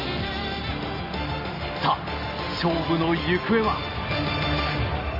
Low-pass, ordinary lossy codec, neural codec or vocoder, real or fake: 5.4 kHz; none; none; real